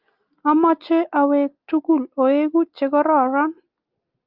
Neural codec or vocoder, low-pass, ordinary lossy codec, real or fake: none; 5.4 kHz; Opus, 24 kbps; real